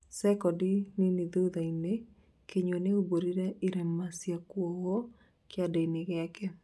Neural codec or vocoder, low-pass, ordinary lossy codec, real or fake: none; none; none; real